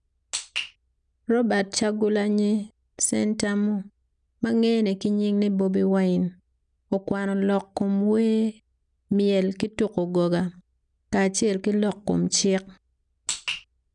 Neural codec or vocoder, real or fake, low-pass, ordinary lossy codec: none; real; 9.9 kHz; none